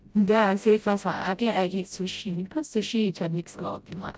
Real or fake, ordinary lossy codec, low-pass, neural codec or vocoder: fake; none; none; codec, 16 kHz, 0.5 kbps, FreqCodec, smaller model